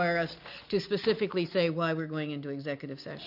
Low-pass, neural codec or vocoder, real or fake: 5.4 kHz; none; real